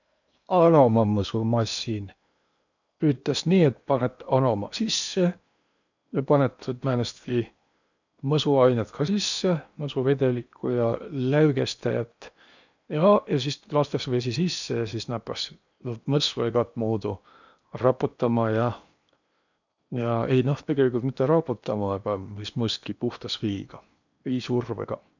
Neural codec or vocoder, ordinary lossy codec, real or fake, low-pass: codec, 16 kHz in and 24 kHz out, 0.8 kbps, FocalCodec, streaming, 65536 codes; none; fake; 7.2 kHz